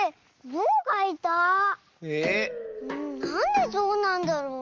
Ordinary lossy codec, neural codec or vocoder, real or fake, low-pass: Opus, 32 kbps; none; real; 7.2 kHz